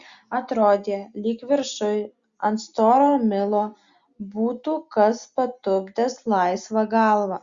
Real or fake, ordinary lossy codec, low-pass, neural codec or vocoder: real; Opus, 64 kbps; 7.2 kHz; none